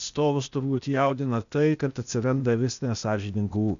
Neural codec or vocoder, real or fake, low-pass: codec, 16 kHz, 0.8 kbps, ZipCodec; fake; 7.2 kHz